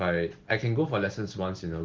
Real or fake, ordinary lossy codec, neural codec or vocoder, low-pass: real; Opus, 16 kbps; none; 7.2 kHz